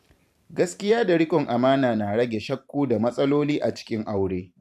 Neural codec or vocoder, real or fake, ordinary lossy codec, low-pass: vocoder, 44.1 kHz, 128 mel bands every 512 samples, BigVGAN v2; fake; none; 14.4 kHz